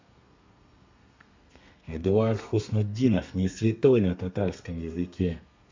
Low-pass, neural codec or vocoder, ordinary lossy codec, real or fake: 7.2 kHz; codec, 32 kHz, 1.9 kbps, SNAC; MP3, 64 kbps; fake